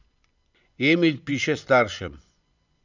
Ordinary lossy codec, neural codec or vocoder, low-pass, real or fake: none; vocoder, 24 kHz, 100 mel bands, Vocos; 7.2 kHz; fake